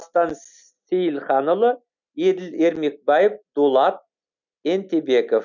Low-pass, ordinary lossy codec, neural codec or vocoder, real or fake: 7.2 kHz; none; none; real